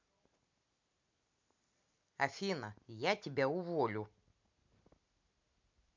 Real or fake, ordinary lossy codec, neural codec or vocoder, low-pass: real; MP3, 64 kbps; none; 7.2 kHz